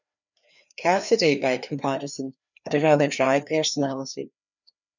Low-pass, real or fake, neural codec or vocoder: 7.2 kHz; fake; codec, 16 kHz, 2 kbps, FreqCodec, larger model